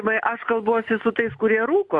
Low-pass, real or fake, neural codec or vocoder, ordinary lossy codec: 10.8 kHz; real; none; AAC, 64 kbps